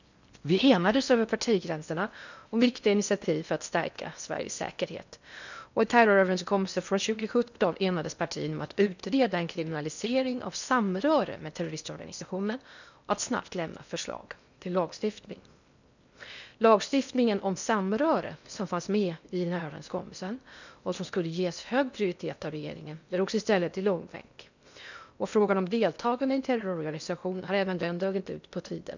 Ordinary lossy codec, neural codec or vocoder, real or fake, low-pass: none; codec, 16 kHz in and 24 kHz out, 0.6 kbps, FocalCodec, streaming, 4096 codes; fake; 7.2 kHz